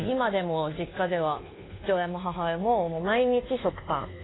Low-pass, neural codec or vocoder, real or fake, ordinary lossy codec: 7.2 kHz; codec, 24 kHz, 1.2 kbps, DualCodec; fake; AAC, 16 kbps